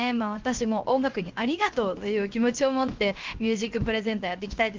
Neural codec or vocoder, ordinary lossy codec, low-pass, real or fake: codec, 16 kHz, 0.7 kbps, FocalCodec; Opus, 32 kbps; 7.2 kHz; fake